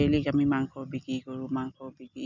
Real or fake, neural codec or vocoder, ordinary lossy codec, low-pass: real; none; none; 7.2 kHz